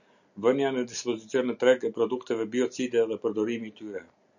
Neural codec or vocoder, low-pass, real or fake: none; 7.2 kHz; real